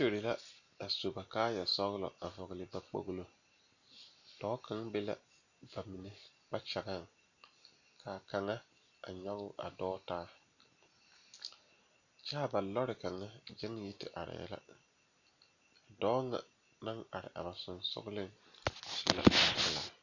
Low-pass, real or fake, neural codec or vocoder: 7.2 kHz; real; none